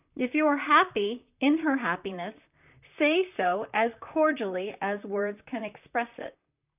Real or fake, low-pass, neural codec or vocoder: fake; 3.6 kHz; vocoder, 44.1 kHz, 128 mel bands, Pupu-Vocoder